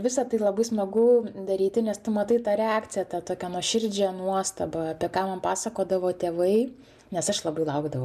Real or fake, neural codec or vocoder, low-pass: real; none; 14.4 kHz